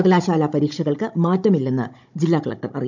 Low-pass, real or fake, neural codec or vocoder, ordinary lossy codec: 7.2 kHz; fake; codec, 16 kHz, 16 kbps, FunCodec, trained on Chinese and English, 50 frames a second; none